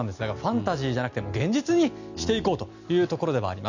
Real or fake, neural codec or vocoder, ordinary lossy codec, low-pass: real; none; none; 7.2 kHz